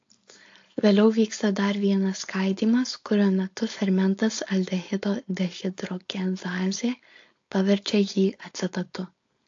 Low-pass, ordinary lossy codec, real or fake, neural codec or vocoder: 7.2 kHz; AAC, 48 kbps; fake; codec, 16 kHz, 4.8 kbps, FACodec